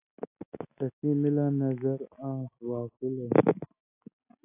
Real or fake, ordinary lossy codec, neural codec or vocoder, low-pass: real; AAC, 24 kbps; none; 3.6 kHz